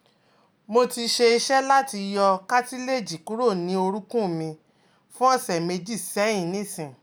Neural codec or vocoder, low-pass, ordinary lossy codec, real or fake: none; none; none; real